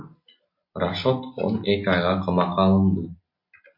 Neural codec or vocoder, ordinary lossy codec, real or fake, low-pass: none; MP3, 32 kbps; real; 5.4 kHz